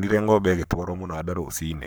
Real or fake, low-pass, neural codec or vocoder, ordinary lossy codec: fake; none; codec, 44.1 kHz, 7.8 kbps, Pupu-Codec; none